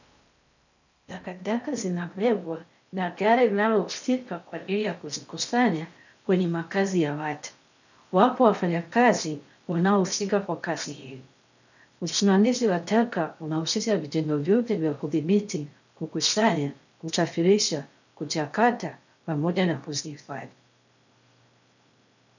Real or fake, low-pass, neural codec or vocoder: fake; 7.2 kHz; codec, 16 kHz in and 24 kHz out, 0.6 kbps, FocalCodec, streaming, 4096 codes